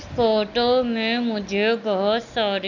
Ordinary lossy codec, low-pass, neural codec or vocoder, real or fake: none; 7.2 kHz; none; real